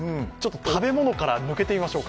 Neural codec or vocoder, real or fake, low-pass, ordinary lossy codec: none; real; none; none